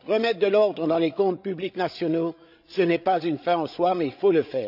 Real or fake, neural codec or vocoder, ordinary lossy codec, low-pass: fake; codec, 16 kHz, 16 kbps, FreqCodec, larger model; none; 5.4 kHz